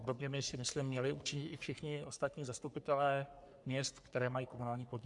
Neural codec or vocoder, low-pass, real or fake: codec, 44.1 kHz, 3.4 kbps, Pupu-Codec; 10.8 kHz; fake